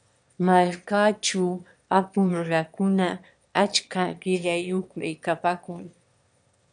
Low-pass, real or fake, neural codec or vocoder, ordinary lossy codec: 9.9 kHz; fake; autoencoder, 22.05 kHz, a latent of 192 numbers a frame, VITS, trained on one speaker; MP3, 96 kbps